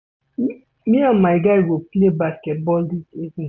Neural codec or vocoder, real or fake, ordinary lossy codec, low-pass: none; real; none; none